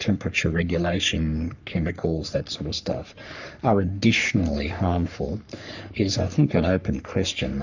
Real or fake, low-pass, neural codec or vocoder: fake; 7.2 kHz; codec, 44.1 kHz, 3.4 kbps, Pupu-Codec